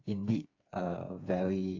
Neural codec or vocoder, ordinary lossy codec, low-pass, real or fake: codec, 16 kHz, 4 kbps, FreqCodec, smaller model; AAC, 48 kbps; 7.2 kHz; fake